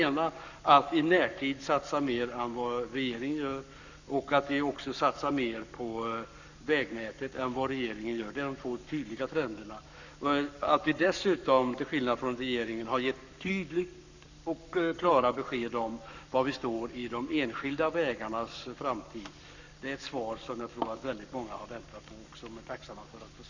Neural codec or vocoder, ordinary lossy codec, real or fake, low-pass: codec, 44.1 kHz, 7.8 kbps, Pupu-Codec; none; fake; 7.2 kHz